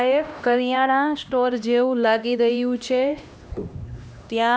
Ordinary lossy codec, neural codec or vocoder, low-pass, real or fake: none; codec, 16 kHz, 1 kbps, X-Codec, HuBERT features, trained on LibriSpeech; none; fake